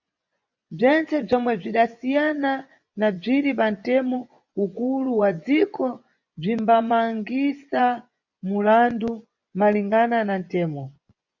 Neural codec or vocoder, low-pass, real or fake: vocoder, 24 kHz, 100 mel bands, Vocos; 7.2 kHz; fake